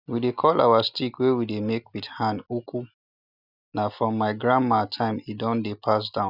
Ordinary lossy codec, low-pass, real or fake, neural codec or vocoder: none; 5.4 kHz; real; none